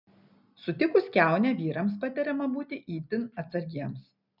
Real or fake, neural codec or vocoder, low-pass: real; none; 5.4 kHz